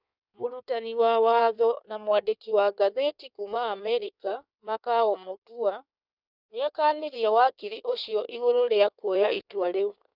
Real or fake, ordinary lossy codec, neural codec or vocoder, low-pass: fake; none; codec, 16 kHz in and 24 kHz out, 1.1 kbps, FireRedTTS-2 codec; 5.4 kHz